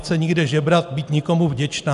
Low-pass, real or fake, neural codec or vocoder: 10.8 kHz; real; none